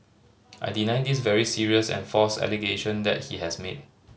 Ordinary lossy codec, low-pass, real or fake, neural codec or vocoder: none; none; real; none